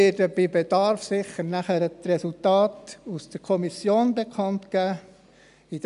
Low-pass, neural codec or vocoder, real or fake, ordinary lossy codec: 10.8 kHz; none; real; none